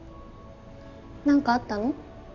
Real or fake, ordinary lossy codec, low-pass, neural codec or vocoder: real; none; 7.2 kHz; none